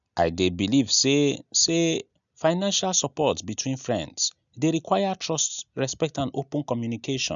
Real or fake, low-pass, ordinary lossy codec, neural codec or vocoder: real; 7.2 kHz; none; none